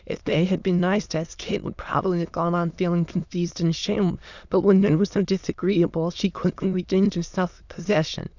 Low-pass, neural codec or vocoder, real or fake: 7.2 kHz; autoencoder, 22.05 kHz, a latent of 192 numbers a frame, VITS, trained on many speakers; fake